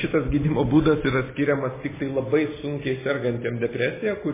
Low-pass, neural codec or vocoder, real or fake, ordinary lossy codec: 3.6 kHz; none; real; MP3, 16 kbps